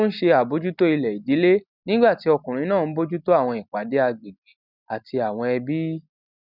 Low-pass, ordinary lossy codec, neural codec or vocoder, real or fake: 5.4 kHz; none; none; real